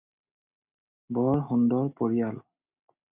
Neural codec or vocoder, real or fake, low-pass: none; real; 3.6 kHz